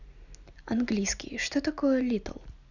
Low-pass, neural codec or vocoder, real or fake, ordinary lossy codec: 7.2 kHz; none; real; none